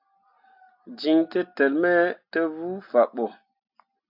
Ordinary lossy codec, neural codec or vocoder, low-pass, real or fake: AAC, 48 kbps; none; 5.4 kHz; real